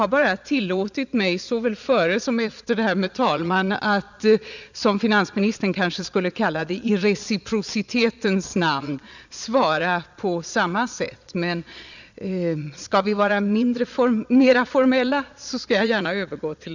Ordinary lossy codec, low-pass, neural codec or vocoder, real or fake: none; 7.2 kHz; vocoder, 22.05 kHz, 80 mel bands, Vocos; fake